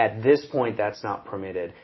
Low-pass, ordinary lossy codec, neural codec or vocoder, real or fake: 7.2 kHz; MP3, 24 kbps; none; real